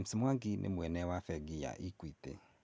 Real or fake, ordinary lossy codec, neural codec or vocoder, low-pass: real; none; none; none